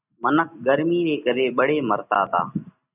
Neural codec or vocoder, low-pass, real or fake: none; 3.6 kHz; real